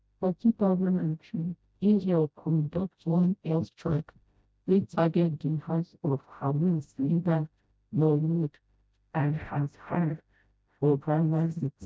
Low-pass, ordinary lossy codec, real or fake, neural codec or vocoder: none; none; fake; codec, 16 kHz, 0.5 kbps, FreqCodec, smaller model